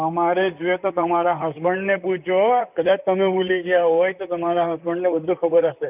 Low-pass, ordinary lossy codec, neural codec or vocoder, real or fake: 3.6 kHz; none; vocoder, 44.1 kHz, 128 mel bands, Pupu-Vocoder; fake